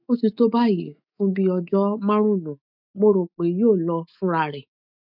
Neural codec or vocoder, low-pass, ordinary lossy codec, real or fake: autoencoder, 48 kHz, 128 numbers a frame, DAC-VAE, trained on Japanese speech; 5.4 kHz; MP3, 48 kbps; fake